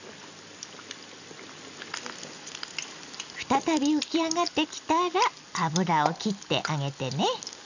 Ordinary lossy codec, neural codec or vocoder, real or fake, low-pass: none; none; real; 7.2 kHz